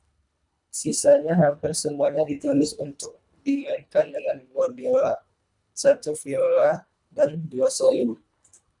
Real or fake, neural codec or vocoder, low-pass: fake; codec, 24 kHz, 1.5 kbps, HILCodec; 10.8 kHz